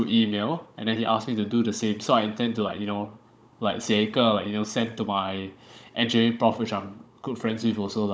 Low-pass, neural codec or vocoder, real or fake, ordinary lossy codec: none; codec, 16 kHz, 16 kbps, FunCodec, trained on Chinese and English, 50 frames a second; fake; none